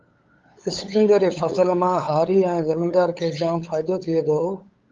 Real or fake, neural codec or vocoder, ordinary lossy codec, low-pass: fake; codec, 16 kHz, 8 kbps, FunCodec, trained on LibriTTS, 25 frames a second; Opus, 32 kbps; 7.2 kHz